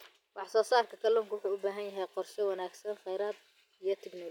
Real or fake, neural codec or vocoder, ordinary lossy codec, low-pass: real; none; none; 19.8 kHz